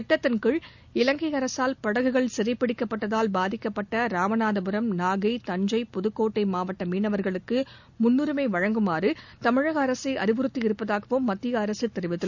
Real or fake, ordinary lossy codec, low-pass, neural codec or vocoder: real; none; 7.2 kHz; none